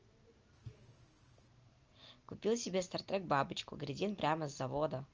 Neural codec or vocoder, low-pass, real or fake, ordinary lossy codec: none; 7.2 kHz; real; Opus, 24 kbps